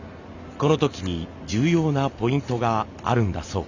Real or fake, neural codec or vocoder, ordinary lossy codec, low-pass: real; none; none; 7.2 kHz